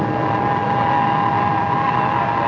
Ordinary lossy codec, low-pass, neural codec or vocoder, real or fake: AAC, 32 kbps; 7.2 kHz; none; real